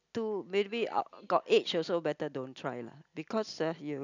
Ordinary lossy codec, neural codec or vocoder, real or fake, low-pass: none; none; real; 7.2 kHz